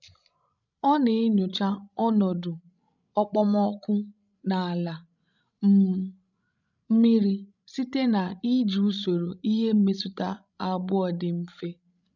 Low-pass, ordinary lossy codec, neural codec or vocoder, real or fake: 7.2 kHz; none; codec, 16 kHz, 16 kbps, FreqCodec, larger model; fake